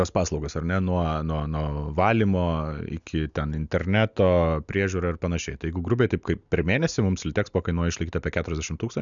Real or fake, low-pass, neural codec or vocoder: real; 7.2 kHz; none